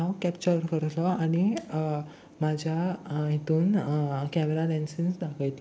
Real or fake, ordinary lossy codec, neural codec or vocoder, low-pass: real; none; none; none